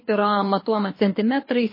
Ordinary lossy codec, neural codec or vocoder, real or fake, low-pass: MP3, 24 kbps; codec, 24 kHz, 6 kbps, HILCodec; fake; 5.4 kHz